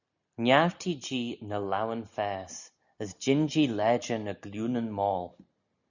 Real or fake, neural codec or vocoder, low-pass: real; none; 7.2 kHz